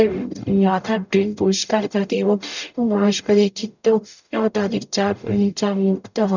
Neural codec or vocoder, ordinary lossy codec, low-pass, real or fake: codec, 44.1 kHz, 0.9 kbps, DAC; none; 7.2 kHz; fake